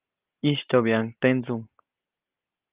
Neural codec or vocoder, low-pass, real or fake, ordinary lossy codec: none; 3.6 kHz; real; Opus, 32 kbps